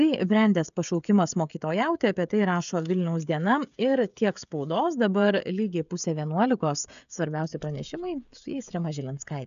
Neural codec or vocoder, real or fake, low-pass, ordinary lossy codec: codec, 16 kHz, 16 kbps, FreqCodec, smaller model; fake; 7.2 kHz; AAC, 96 kbps